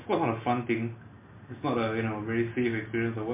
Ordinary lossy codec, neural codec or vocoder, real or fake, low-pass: MP3, 24 kbps; none; real; 3.6 kHz